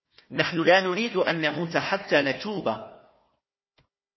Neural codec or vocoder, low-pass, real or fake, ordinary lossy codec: codec, 16 kHz, 1 kbps, FunCodec, trained on Chinese and English, 50 frames a second; 7.2 kHz; fake; MP3, 24 kbps